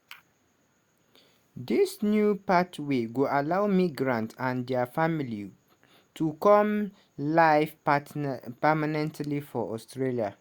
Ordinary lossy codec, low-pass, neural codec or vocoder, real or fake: Opus, 64 kbps; 19.8 kHz; none; real